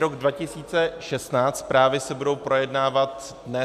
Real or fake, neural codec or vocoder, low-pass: real; none; 14.4 kHz